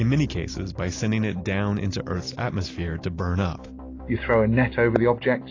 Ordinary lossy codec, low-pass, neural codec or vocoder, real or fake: AAC, 32 kbps; 7.2 kHz; none; real